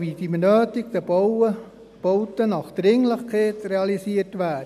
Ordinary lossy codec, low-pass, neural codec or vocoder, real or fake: none; 14.4 kHz; none; real